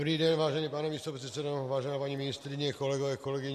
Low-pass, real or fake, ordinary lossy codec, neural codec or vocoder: 14.4 kHz; real; MP3, 64 kbps; none